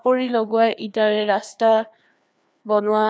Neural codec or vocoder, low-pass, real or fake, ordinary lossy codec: codec, 16 kHz, 2 kbps, FreqCodec, larger model; none; fake; none